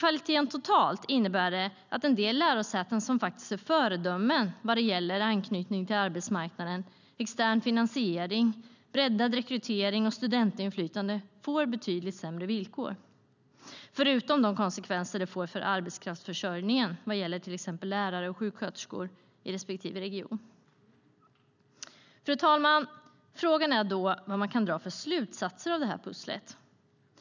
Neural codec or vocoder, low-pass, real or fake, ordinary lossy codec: none; 7.2 kHz; real; none